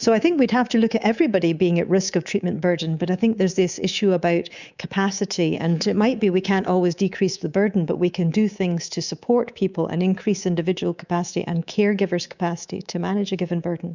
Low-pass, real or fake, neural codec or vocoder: 7.2 kHz; fake; codec, 24 kHz, 3.1 kbps, DualCodec